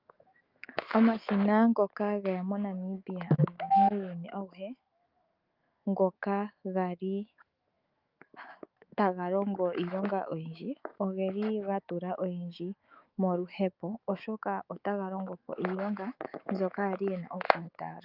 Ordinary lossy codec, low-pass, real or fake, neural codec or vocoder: Opus, 24 kbps; 5.4 kHz; fake; autoencoder, 48 kHz, 128 numbers a frame, DAC-VAE, trained on Japanese speech